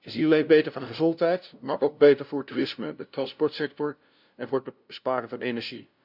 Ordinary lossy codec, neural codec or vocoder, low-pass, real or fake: none; codec, 16 kHz, 0.5 kbps, FunCodec, trained on LibriTTS, 25 frames a second; 5.4 kHz; fake